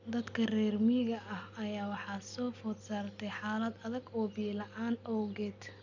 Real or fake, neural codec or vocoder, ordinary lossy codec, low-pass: real; none; none; 7.2 kHz